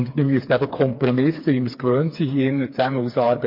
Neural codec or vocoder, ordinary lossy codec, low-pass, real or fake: codec, 16 kHz, 4 kbps, FreqCodec, smaller model; MP3, 32 kbps; 5.4 kHz; fake